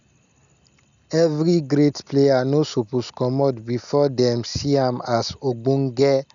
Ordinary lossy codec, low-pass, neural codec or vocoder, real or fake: none; 7.2 kHz; none; real